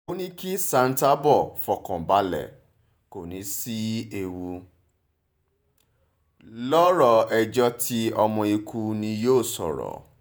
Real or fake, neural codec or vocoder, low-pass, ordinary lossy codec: fake; vocoder, 48 kHz, 128 mel bands, Vocos; none; none